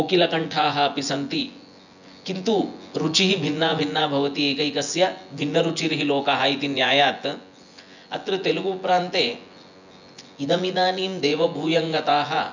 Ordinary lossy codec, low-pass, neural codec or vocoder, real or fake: none; 7.2 kHz; vocoder, 24 kHz, 100 mel bands, Vocos; fake